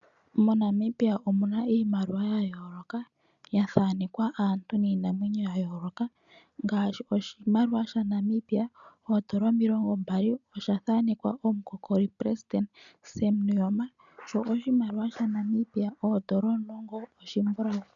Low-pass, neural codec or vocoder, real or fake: 7.2 kHz; none; real